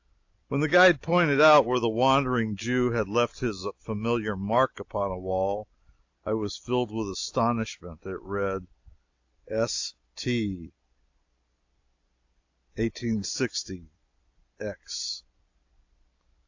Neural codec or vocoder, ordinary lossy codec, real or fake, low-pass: none; AAC, 48 kbps; real; 7.2 kHz